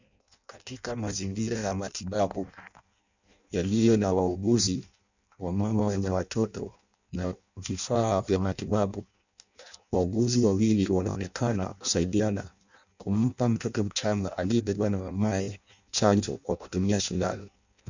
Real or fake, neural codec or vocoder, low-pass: fake; codec, 16 kHz in and 24 kHz out, 0.6 kbps, FireRedTTS-2 codec; 7.2 kHz